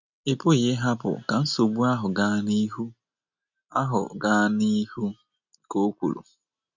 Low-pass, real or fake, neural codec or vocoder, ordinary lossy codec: 7.2 kHz; real; none; none